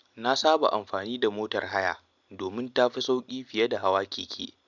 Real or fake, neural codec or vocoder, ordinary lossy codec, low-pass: real; none; none; 7.2 kHz